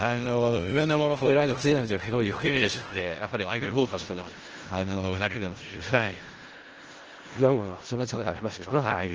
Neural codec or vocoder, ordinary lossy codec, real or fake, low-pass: codec, 16 kHz in and 24 kHz out, 0.4 kbps, LongCat-Audio-Codec, four codebook decoder; Opus, 16 kbps; fake; 7.2 kHz